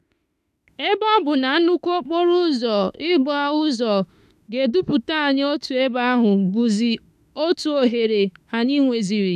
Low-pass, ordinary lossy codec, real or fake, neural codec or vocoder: 14.4 kHz; none; fake; autoencoder, 48 kHz, 32 numbers a frame, DAC-VAE, trained on Japanese speech